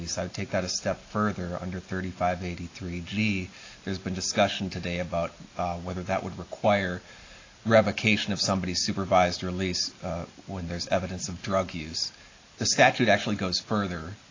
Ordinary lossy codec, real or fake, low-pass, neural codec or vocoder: AAC, 32 kbps; real; 7.2 kHz; none